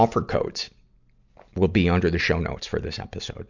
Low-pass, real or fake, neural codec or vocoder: 7.2 kHz; real; none